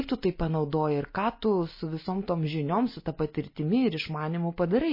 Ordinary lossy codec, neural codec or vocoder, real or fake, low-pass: MP3, 24 kbps; none; real; 5.4 kHz